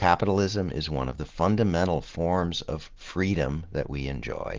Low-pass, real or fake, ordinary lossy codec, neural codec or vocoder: 7.2 kHz; real; Opus, 16 kbps; none